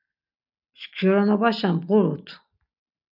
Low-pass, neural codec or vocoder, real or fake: 5.4 kHz; none; real